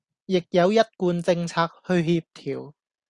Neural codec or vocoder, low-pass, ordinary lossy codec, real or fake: none; 10.8 kHz; Opus, 64 kbps; real